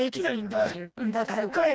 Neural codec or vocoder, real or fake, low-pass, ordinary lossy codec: codec, 16 kHz, 1 kbps, FreqCodec, smaller model; fake; none; none